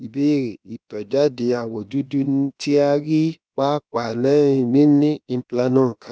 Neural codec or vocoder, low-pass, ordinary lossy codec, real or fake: codec, 16 kHz, about 1 kbps, DyCAST, with the encoder's durations; none; none; fake